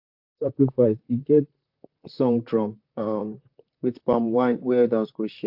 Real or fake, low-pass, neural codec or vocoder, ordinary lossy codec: fake; 5.4 kHz; vocoder, 44.1 kHz, 128 mel bands, Pupu-Vocoder; AAC, 48 kbps